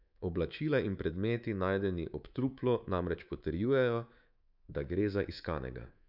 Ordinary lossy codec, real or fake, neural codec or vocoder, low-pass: none; fake; codec, 24 kHz, 3.1 kbps, DualCodec; 5.4 kHz